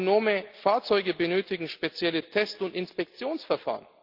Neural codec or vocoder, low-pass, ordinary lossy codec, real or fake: none; 5.4 kHz; Opus, 24 kbps; real